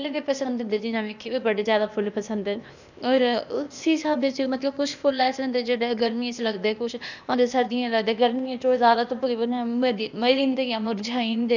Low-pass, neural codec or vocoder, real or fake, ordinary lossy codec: 7.2 kHz; codec, 16 kHz, 0.8 kbps, ZipCodec; fake; none